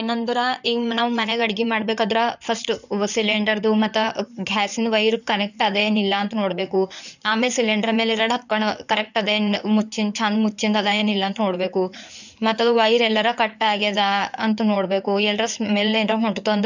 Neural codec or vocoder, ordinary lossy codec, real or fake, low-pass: codec, 16 kHz in and 24 kHz out, 2.2 kbps, FireRedTTS-2 codec; none; fake; 7.2 kHz